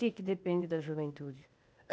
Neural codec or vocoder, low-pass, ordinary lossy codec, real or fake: codec, 16 kHz, 0.8 kbps, ZipCodec; none; none; fake